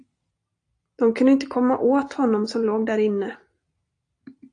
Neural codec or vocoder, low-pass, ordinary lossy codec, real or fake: none; 9.9 kHz; AAC, 48 kbps; real